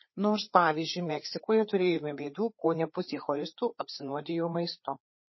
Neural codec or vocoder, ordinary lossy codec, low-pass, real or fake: codec, 16 kHz, 4 kbps, FreqCodec, larger model; MP3, 24 kbps; 7.2 kHz; fake